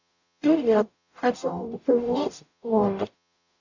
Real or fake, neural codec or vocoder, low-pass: fake; codec, 44.1 kHz, 0.9 kbps, DAC; 7.2 kHz